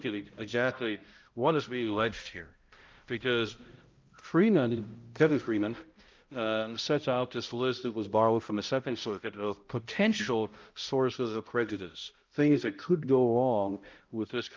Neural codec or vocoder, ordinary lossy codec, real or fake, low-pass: codec, 16 kHz, 0.5 kbps, X-Codec, HuBERT features, trained on balanced general audio; Opus, 32 kbps; fake; 7.2 kHz